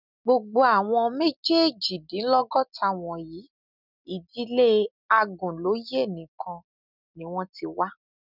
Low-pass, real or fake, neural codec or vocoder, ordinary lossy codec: 5.4 kHz; real; none; none